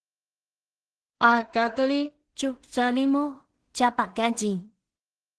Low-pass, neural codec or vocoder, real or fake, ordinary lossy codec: 10.8 kHz; codec, 16 kHz in and 24 kHz out, 0.4 kbps, LongCat-Audio-Codec, two codebook decoder; fake; Opus, 16 kbps